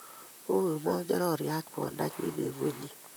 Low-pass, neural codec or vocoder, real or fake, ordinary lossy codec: none; vocoder, 44.1 kHz, 128 mel bands, Pupu-Vocoder; fake; none